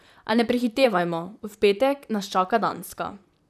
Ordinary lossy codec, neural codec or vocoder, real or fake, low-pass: none; vocoder, 44.1 kHz, 128 mel bands, Pupu-Vocoder; fake; 14.4 kHz